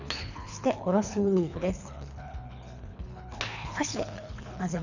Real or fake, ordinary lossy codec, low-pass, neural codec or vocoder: fake; none; 7.2 kHz; codec, 24 kHz, 3 kbps, HILCodec